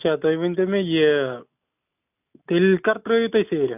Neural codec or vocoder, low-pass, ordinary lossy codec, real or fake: none; 3.6 kHz; none; real